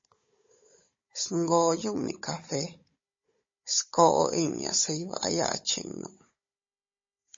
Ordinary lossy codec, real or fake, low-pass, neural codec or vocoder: MP3, 32 kbps; fake; 7.2 kHz; codec, 16 kHz, 16 kbps, FunCodec, trained on Chinese and English, 50 frames a second